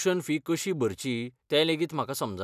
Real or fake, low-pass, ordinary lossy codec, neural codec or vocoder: real; 14.4 kHz; none; none